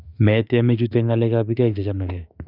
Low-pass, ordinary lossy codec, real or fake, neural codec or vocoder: 5.4 kHz; none; fake; autoencoder, 48 kHz, 32 numbers a frame, DAC-VAE, trained on Japanese speech